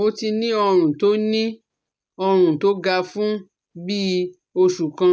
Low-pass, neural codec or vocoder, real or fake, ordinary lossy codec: none; none; real; none